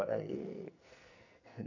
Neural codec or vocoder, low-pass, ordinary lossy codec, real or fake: codec, 44.1 kHz, 2.6 kbps, SNAC; 7.2 kHz; none; fake